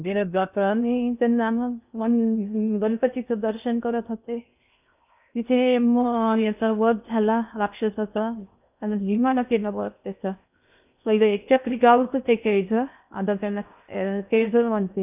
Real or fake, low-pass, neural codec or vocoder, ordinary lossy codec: fake; 3.6 kHz; codec, 16 kHz in and 24 kHz out, 0.6 kbps, FocalCodec, streaming, 2048 codes; none